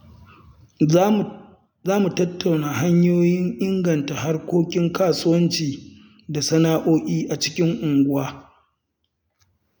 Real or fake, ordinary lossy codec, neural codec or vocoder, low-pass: real; none; none; none